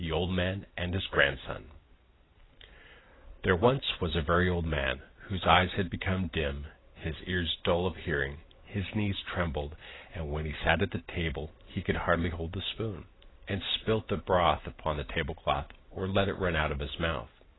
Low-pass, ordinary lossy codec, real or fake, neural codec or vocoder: 7.2 kHz; AAC, 16 kbps; real; none